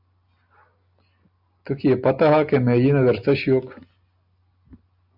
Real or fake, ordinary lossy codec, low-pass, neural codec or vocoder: real; AAC, 48 kbps; 5.4 kHz; none